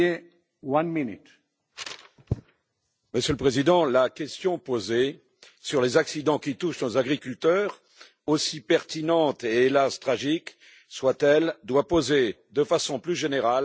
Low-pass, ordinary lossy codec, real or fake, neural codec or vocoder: none; none; real; none